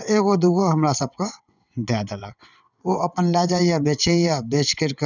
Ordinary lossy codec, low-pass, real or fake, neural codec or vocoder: none; 7.2 kHz; fake; vocoder, 22.05 kHz, 80 mel bands, WaveNeXt